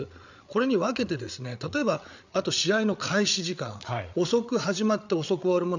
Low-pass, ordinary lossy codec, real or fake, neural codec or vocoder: 7.2 kHz; none; fake; codec, 16 kHz, 8 kbps, FreqCodec, larger model